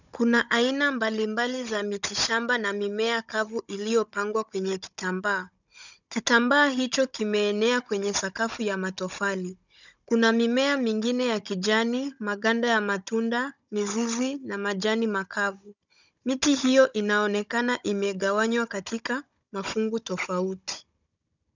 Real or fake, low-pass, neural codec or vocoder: fake; 7.2 kHz; codec, 16 kHz, 16 kbps, FunCodec, trained on Chinese and English, 50 frames a second